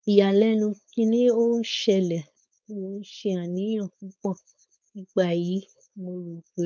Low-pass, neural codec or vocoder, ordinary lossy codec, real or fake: none; codec, 16 kHz, 4.8 kbps, FACodec; none; fake